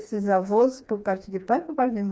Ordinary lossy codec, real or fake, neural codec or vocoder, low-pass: none; fake; codec, 16 kHz, 2 kbps, FreqCodec, smaller model; none